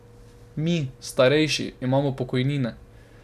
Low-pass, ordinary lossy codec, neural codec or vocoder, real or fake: 14.4 kHz; none; none; real